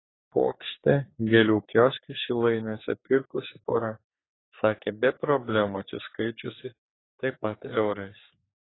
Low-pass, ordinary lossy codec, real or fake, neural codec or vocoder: 7.2 kHz; AAC, 16 kbps; fake; codec, 44.1 kHz, 3.4 kbps, Pupu-Codec